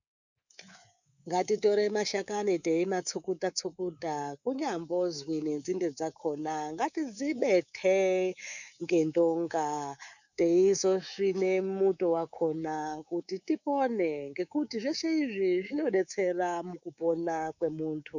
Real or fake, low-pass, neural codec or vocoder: fake; 7.2 kHz; codec, 24 kHz, 3.1 kbps, DualCodec